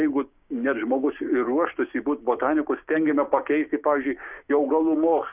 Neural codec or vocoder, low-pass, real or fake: codec, 16 kHz, 6 kbps, DAC; 3.6 kHz; fake